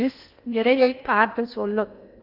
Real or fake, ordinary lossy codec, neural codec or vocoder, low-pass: fake; Opus, 64 kbps; codec, 16 kHz in and 24 kHz out, 0.6 kbps, FocalCodec, streaming, 2048 codes; 5.4 kHz